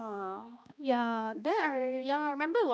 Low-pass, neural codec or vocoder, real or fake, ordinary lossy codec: none; codec, 16 kHz, 1 kbps, X-Codec, HuBERT features, trained on balanced general audio; fake; none